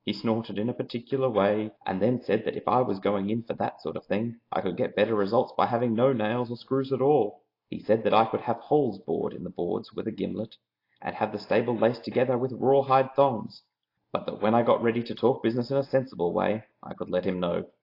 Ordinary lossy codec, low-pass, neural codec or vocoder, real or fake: AAC, 32 kbps; 5.4 kHz; none; real